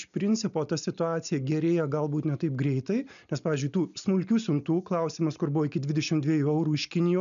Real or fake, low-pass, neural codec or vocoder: real; 7.2 kHz; none